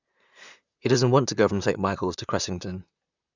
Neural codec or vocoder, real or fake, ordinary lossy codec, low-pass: vocoder, 44.1 kHz, 128 mel bands, Pupu-Vocoder; fake; none; 7.2 kHz